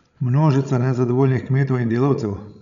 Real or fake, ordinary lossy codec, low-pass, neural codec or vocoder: fake; none; 7.2 kHz; codec, 16 kHz, 16 kbps, FreqCodec, larger model